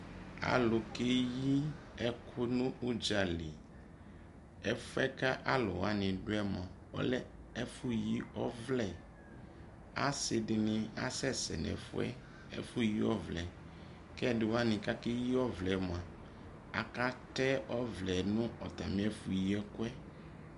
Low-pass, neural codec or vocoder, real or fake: 10.8 kHz; none; real